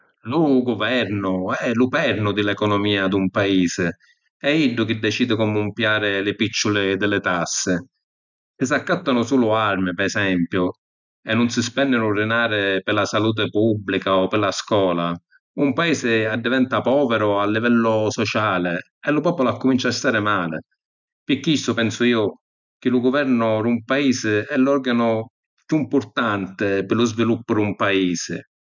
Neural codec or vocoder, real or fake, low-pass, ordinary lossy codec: none; real; 7.2 kHz; none